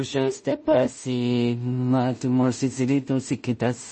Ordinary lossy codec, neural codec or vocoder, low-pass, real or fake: MP3, 32 kbps; codec, 16 kHz in and 24 kHz out, 0.4 kbps, LongCat-Audio-Codec, two codebook decoder; 10.8 kHz; fake